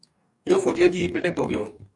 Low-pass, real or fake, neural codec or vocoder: 10.8 kHz; fake; codec, 44.1 kHz, 2.6 kbps, DAC